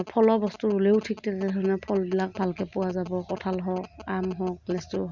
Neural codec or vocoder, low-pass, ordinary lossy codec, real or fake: none; 7.2 kHz; Opus, 64 kbps; real